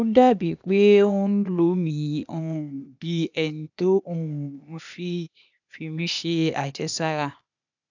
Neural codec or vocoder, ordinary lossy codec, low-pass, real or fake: codec, 16 kHz, 0.8 kbps, ZipCodec; none; 7.2 kHz; fake